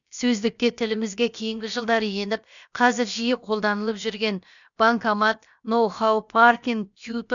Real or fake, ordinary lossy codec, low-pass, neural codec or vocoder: fake; none; 7.2 kHz; codec, 16 kHz, about 1 kbps, DyCAST, with the encoder's durations